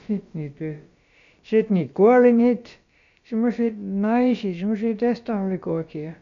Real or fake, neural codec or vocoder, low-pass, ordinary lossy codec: fake; codec, 16 kHz, about 1 kbps, DyCAST, with the encoder's durations; 7.2 kHz; MP3, 64 kbps